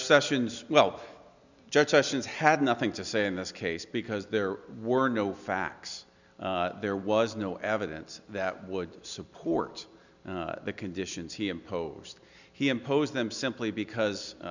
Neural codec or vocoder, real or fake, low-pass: none; real; 7.2 kHz